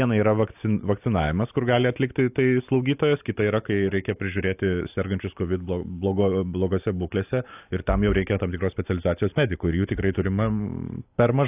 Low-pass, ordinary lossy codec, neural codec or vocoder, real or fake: 3.6 kHz; AAC, 32 kbps; none; real